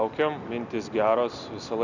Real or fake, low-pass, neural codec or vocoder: real; 7.2 kHz; none